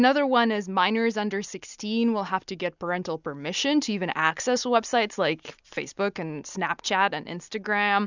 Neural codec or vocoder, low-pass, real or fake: none; 7.2 kHz; real